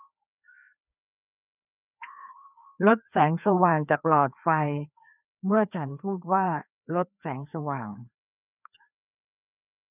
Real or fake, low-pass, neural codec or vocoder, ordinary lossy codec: fake; 3.6 kHz; codec, 16 kHz, 2 kbps, FreqCodec, larger model; none